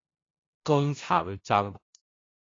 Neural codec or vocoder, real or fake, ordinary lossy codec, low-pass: codec, 16 kHz, 0.5 kbps, FunCodec, trained on LibriTTS, 25 frames a second; fake; AAC, 32 kbps; 7.2 kHz